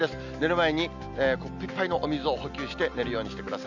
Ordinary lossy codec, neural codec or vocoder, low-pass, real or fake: none; none; 7.2 kHz; real